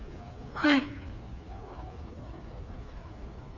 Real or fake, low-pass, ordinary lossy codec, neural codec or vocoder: fake; 7.2 kHz; none; codec, 16 kHz, 4 kbps, FreqCodec, smaller model